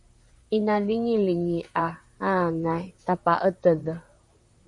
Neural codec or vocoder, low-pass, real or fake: vocoder, 44.1 kHz, 128 mel bands, Pupu-Vocoder; 10.8 kHz; fake